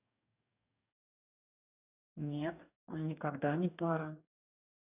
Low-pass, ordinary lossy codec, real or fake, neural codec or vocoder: 3.6 kHz; none; fake; codec, 44.1 kHz, 2.6 kbps, DAC